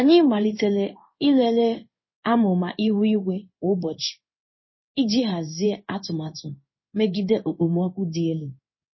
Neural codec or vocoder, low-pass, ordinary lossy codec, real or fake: codec, 16 kHz in and 24 kHz out, 1 kbps, XY-Tokenizer; 7.2 kHz; MP3, 24 kbps; fake